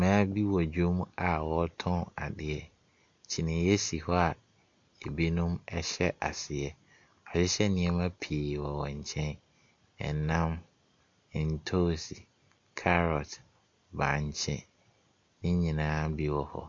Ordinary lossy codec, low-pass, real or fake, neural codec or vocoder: MP3, 48 kbps; 7.2 kHz; real; none